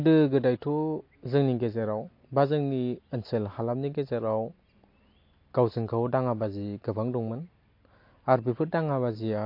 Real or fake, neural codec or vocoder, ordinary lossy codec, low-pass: real; none; MP3, 32 kbps; 5.4 kHz